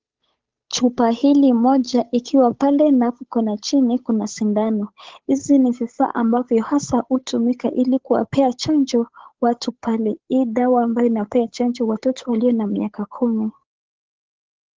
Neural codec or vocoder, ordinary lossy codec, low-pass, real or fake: codec, 16 kHz, 8 kbps, FunCodec, trained on Chinese and English, 25 frames a second; Opus, 16 kbps; 7.2 kHz; fake